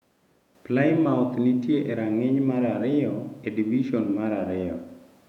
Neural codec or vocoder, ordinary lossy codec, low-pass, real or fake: vocoder, 48 kHz, 128 mel bands, Vocos; none; 19.8 kHz; fake